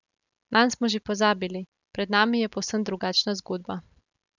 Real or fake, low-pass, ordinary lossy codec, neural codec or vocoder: real; 7.2 kHz; none; none